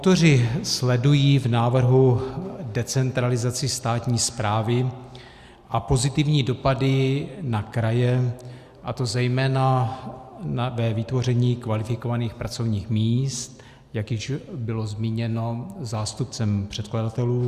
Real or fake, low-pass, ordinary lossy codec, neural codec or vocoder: real; 14.4 kHz; Opus, 64 kbps; none